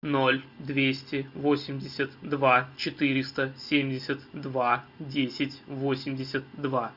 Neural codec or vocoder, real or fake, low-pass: none; real; 5.4 kHz